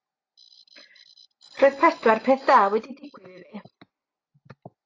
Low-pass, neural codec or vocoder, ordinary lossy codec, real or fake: 7.2 kHz; none; AAC, 32 kbps; real